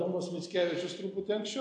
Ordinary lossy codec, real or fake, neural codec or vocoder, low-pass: AAC, 64 kbps; real; none; 9.9 kHz